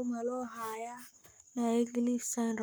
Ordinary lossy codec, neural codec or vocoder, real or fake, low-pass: none; codec, 44.1 kHz, 7.8 kbps, Pupu-Codec; fake; none